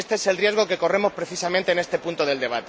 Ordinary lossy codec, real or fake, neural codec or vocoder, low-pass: none; real; none; none